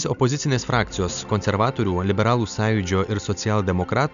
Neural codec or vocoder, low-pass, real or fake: none; 7.2 kHz; real